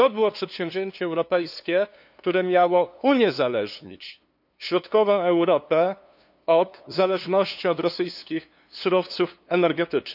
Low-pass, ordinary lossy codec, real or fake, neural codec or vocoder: 5.4 kHz; none; fake; codec, 16 kHz, 2 kbps, FunCodec, trained on LibriTTS, 25 frames a second